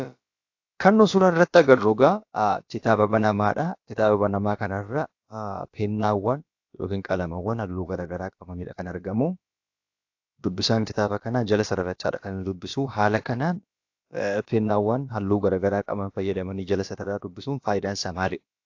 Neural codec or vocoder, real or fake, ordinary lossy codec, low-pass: codec, 16 kHz, about 1 kbps, DyCAST, with the encoder's durations; fake; AAC, 48 kbps; 7.2 kHz